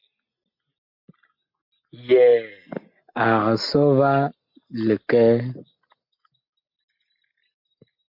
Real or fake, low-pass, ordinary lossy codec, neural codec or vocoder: real; 5.4 kHz; MP3, 48 kbps; none